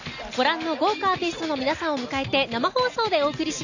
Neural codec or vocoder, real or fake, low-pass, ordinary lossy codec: none; real; 7.2 kHz; none